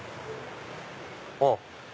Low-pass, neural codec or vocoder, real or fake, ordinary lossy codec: none; none; real; none